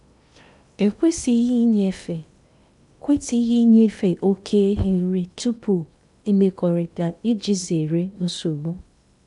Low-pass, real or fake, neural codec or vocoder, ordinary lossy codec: 10.8 kHz; fake; codec, 16 kHz in and 24 kHz out, 0.8 kbps, FocalCodec, streaming, 65536 codes; none